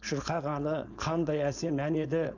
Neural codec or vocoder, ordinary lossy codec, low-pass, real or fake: codec, 16 kHz, 4.8 kbps, FACodec; none; 7.2 kHz; fake